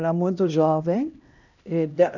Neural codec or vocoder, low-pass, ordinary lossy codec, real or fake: codec, 16 kHz, 1 kbps, X-Codec, HuBERT features, trained on LibriSpeech; 7.2 kHz; none; fake